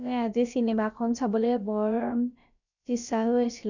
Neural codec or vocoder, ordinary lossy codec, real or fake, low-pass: codec, 16 kHz, about 1 kbps, DyCAST, with the encoder's durations; none; fake; 7.2 kHz